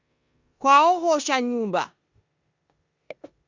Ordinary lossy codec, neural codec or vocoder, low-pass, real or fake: Opus, 64 kbps; codec, 16 kHz in and 24 kHz out, 0.9 kbps, LongCat-Audio-Codec, four codebook decoder; 7.2 kHz; fake